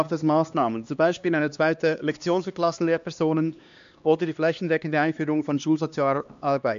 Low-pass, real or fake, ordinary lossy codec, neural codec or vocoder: 7.2 kHz; fake; MP3, 64 kbps; codec, 16 kHz, 2 kbps, X-Codec, HuBERT features, trained on LibriSpeech